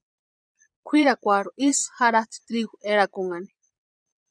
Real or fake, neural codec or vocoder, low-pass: fake; vocoder, 22.05 kHz, 80 mel bands, Vocos; 9.9 kHz